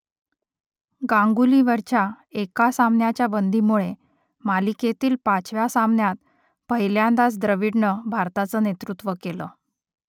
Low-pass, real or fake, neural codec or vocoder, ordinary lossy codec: 19.8 kHz; real; none; none